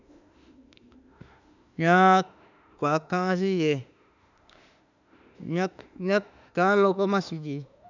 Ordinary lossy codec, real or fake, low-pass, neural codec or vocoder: none; fake; 7.2 kHz; autoencoder, 48 kHz, 32 numbers a frame, DAC-VAE, trained on Japanese speech